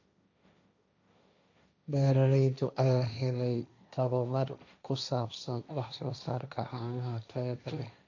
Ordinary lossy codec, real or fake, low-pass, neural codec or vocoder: none; fake; 7.2 kHz; codec, 16 kHz, 1.1 kbps, Voila-Tokenizer